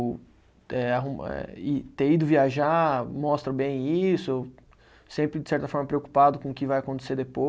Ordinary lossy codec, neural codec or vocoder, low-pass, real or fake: none; none; none; real